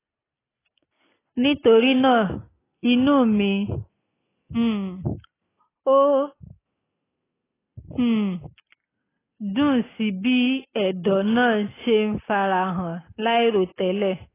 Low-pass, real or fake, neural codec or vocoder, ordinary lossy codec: 3.6 kHz; real; none; AAC, 16 kbps